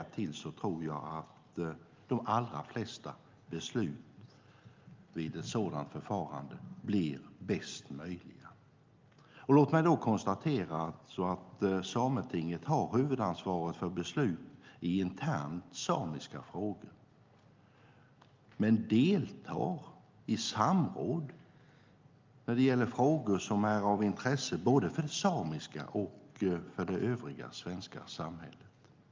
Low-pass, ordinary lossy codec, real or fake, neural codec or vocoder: 7.2 kHz; Opus, 24 kbps; real; none